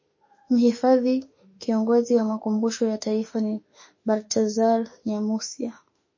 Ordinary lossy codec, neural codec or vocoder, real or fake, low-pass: MP3, 32 kbps; autoencoder, 48 kHz, 32 numbers a frame, DAC-VAE, trained on Japanese speech; fake; 7.2 kHz